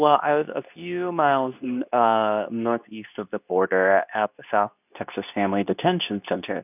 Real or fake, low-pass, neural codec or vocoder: fake; 3.6 kHz; codec, 24 kHz, 0.9 kbps, WavTokenizer, medium speech release version 2